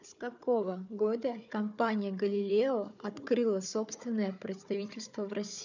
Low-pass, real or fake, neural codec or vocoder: 7.2 kHz; fake; codec, 16 kHz, 4 kbps, FunCodec, trained on Chinese and English, 50 frames a second